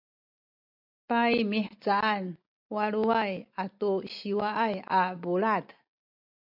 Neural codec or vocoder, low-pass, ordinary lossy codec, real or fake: none; 5.4 kHz; MP3, 48 kbps; real